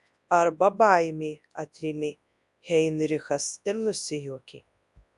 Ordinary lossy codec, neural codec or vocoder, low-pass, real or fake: AAC, 96 kbps; codec, 24 kHz, 0.9 kbps, WavTokenizer, large speech release; 10.8 kHz; fake